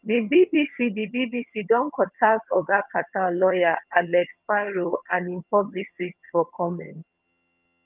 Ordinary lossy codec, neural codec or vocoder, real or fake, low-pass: Opus, 24 kbps; vocoder, 22.05 kHz, 80 mel bands, HiFi-GAN; fake; 3.6 kHz